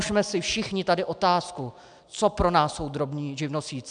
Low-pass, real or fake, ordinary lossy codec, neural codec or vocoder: 9.9 kHz; real; MP3, 96 kbps; none